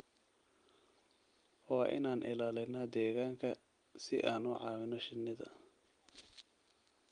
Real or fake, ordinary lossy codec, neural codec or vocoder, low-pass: real; Opus, 32 kbps; none; 9.9 kHz